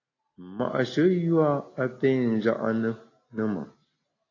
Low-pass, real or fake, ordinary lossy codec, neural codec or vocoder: 7.2 kHz; real; AAC, 32 kbps; none